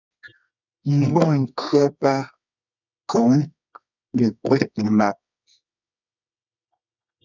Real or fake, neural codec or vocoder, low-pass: fake; codec, 24 kHz, 0.9 kbps, WavTokenizer, medium music audio release; 7.2 kHz